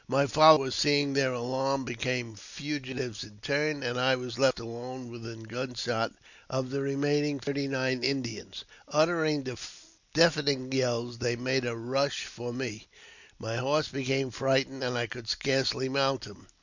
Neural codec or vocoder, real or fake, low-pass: none; real; 7.2 kHz